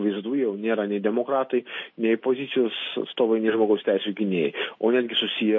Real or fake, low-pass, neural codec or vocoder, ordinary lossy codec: real; 7.2 kHz; none; MP3, 32 kbps